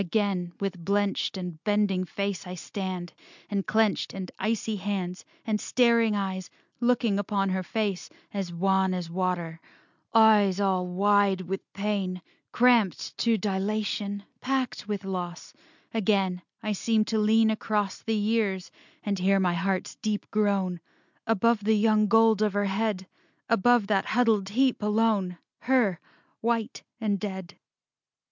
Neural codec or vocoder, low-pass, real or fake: none; 7.2 kHz; real